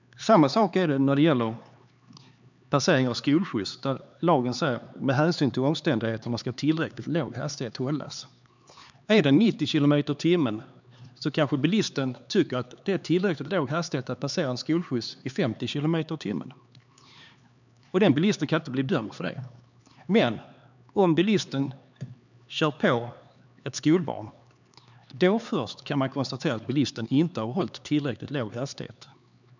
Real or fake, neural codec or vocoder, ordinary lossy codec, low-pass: fake; codec, 16 kHz, 4 kbps, X-Codec, HuBERT features, trained on LibriSpeech; none; 7.2 kHz